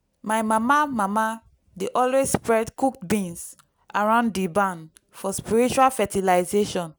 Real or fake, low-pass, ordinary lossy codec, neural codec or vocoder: real; none; none; none